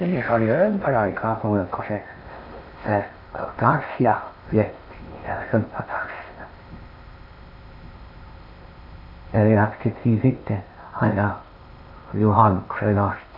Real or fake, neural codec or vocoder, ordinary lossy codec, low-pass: fake; codec, 16 kHz in and 24 kHz out, 0.6 kbps, FocalCodec, streaming, 4096 codes; none; 5.4 kHz